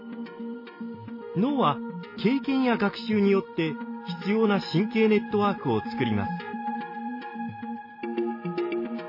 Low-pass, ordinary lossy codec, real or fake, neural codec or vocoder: 5.4 kHz; MP3, 24 kbps; real; none